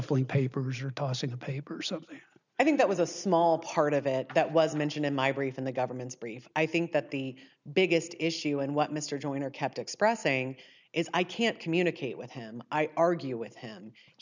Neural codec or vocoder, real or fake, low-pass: none; real; 7.2 kHz